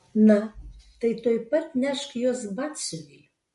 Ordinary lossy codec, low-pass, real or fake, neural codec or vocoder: MP3, 48 kbps; 14.4 kHz; real; none